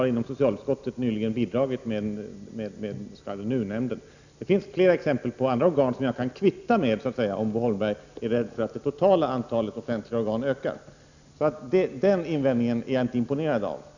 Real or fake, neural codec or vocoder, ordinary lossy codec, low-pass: real; none; none; 7.2 kHz